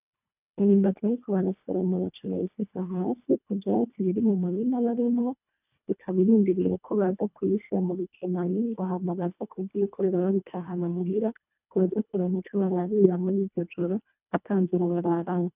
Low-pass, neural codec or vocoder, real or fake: 3.6 kHz; codec, 24 kHz, 1.5 kbps, HILCodec; fake